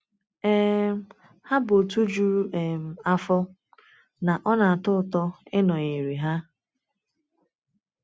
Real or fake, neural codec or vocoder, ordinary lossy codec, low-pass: real; none; none; none